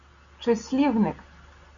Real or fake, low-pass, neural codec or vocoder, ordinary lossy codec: real; 7.2 kHz; none; Opus, 64 kbps